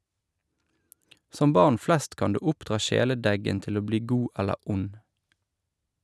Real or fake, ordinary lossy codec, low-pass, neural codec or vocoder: real; none; none; none